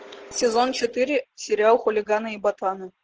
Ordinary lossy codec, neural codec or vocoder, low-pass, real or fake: Opus, 16 kbps; none; 7.2 kHz; real